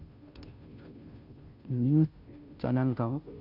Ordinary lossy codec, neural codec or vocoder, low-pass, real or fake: none; codec, 16 kHz, 0.5 kbps, FunCodec, trained on Chinese and English, 25 frames a second; 5.4 kHz; fake